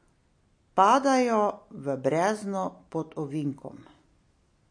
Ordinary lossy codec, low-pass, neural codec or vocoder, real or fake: MP3, 48 kbps; 9.9 kHz; none; real